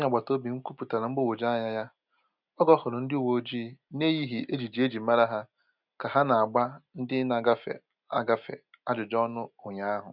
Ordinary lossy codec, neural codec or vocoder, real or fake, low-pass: none; none; real; 5.4 kHz